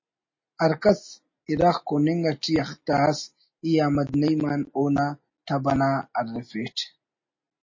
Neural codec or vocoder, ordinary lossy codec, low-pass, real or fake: none; MP3, 32 kbps; 7.2 kHz; real